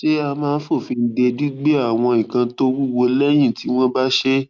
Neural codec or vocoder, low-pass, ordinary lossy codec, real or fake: none; none; none; real